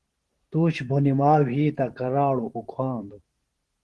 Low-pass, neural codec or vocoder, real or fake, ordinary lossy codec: 10.8 kHz; vocoder, 44.1 kHz, 128 mel bands every 512 samples, BigVGAN v2; fake; Opus, 16 kbps